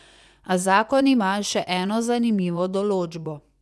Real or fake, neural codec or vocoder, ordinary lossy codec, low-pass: fake; vocoder, 24 kHz, 100 mel bands, Vocos; none; none